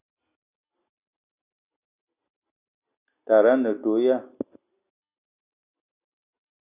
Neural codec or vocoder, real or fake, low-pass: none; real; 3.6 kHz